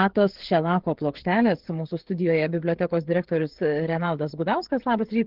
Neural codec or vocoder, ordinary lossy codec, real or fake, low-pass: codec, 16 kHz, 16 kbps, FreqCodec, smaller model; Opus, 16 kbps; fake; 5.4 kHz